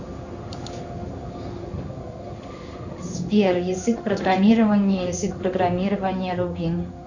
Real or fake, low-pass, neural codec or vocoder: fake; 7.2 kHz; codec, 16 kHz in and 24 kHz out, 1 kbps, XY-Tokenizer